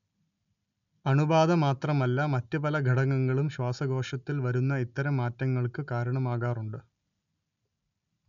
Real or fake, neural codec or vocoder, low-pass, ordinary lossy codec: real; none; 7.2 kHz; none